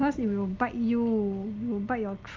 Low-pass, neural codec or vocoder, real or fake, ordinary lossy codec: 7.2 kHz; none; real; Opus, 32 kbps